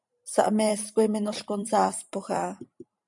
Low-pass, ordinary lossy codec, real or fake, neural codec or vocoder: 10.8 kHz; MP3, 96 kbps; fake; vocoder, 44.1 kHz, 128 mel bands every 512 samples, BigVGAN v2